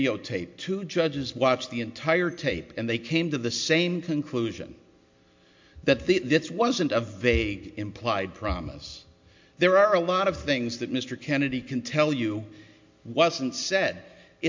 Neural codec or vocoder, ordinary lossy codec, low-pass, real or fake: none; MP3, 48 kbps; 7.2 kHz; real